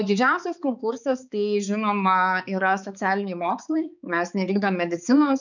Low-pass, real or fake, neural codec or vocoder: 7.2 kHz; fake; codec, 16 kHz, 4 kbps, X-Codec, HuBERT features, trained on balanced general audio